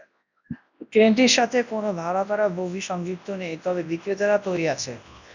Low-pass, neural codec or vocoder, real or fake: 7.2 kHz; codec, 24 kHz, 0.9 kbps, WavTokenizer, large speech release; fake